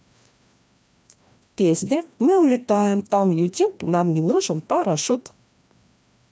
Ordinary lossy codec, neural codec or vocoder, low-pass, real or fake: none; codec, 16 kHz, 1 kbps, FreqCodec, larger model; none; fake